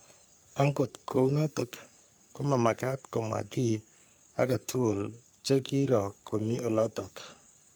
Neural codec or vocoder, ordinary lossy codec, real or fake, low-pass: codec, 44.1 kHz, 3.4 kbps, Pupu-Codec; none; fake; none